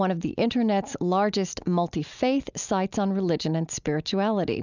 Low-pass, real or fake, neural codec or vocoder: 7.2 kHz; real; none